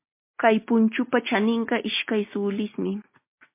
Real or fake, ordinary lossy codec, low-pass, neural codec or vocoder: real; MP3, 24 kbps; 3.6 kHz; none